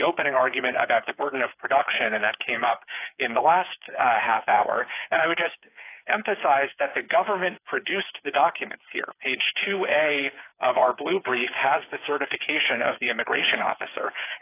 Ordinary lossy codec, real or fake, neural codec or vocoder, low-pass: AAC, 24 kbps; fake; codec, 16 kHz, 4 kbps, FreqCodec, smaller model; 3.6 kHz